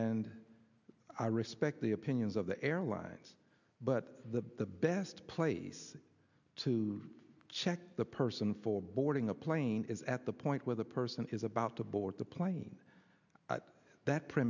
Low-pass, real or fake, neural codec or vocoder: 7.2 kHz; real; none